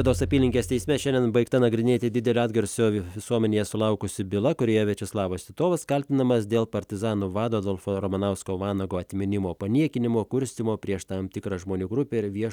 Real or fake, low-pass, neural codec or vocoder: real; 19.8 kHz; none